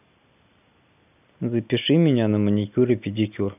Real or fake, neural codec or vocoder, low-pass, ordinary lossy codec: real; none; 3.6 kHz; none